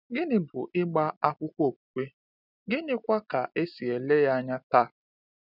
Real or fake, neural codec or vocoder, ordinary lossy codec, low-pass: real; none; none; 5.4 kHz